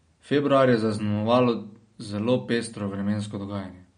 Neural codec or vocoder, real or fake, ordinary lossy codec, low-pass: none; real; MP3, 48 kbps; 9.9 kHz